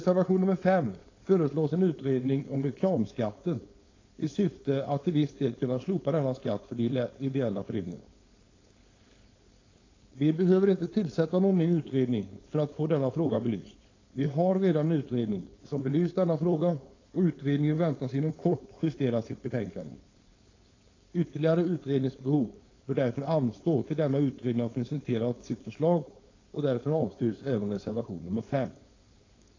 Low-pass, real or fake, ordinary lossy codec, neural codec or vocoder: 7.2 kHz; fake; AAC, 32 kbps; codec, 16 kHz, 4.8 kbps, FACodec